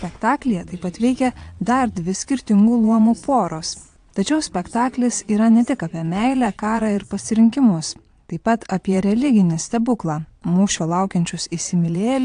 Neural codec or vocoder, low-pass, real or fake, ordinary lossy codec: vocoder, 22.05 kHz, 80 mel bands, Vocos; 9.9 kHz; fake; AAC, 64 kbps